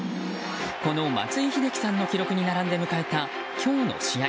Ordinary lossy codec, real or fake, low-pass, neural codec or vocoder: none; real; none; none